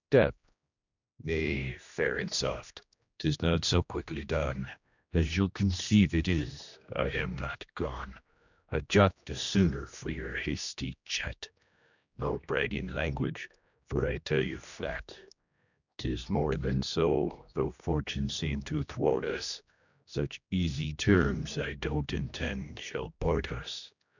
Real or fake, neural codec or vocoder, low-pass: fake; codec, 16 kHz, 1 kbps, X-Codec, HuBERT features, trained on general audio; 7.2 kHz